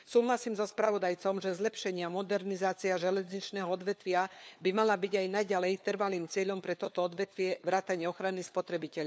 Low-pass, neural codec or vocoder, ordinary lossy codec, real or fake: none; codec, 16 kHz, 4 kbps, FunCodec, trained on LibriTTS, 50 frames a second; none; fake